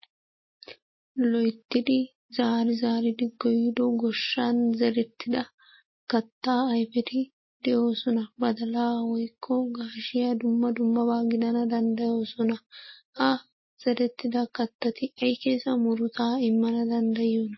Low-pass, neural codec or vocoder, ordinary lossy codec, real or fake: 7.2 kHz; none; MP3, 24 kbps; real